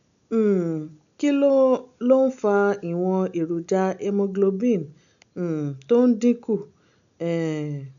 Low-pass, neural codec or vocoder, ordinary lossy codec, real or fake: 7.2 kHz; none; none; real